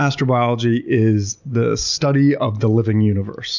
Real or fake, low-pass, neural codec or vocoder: real; 7.2 kHz; none